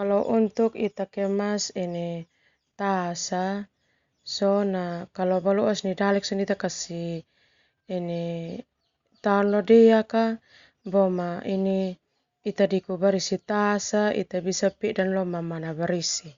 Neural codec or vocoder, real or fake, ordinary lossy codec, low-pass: none; real; Opus, 64 kbps; 7.2 kHz